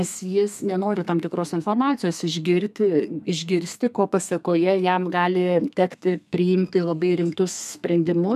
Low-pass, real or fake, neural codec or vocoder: 14.4 kHz; fake; codec, 32 kHz, 1.9 kbps, SNAC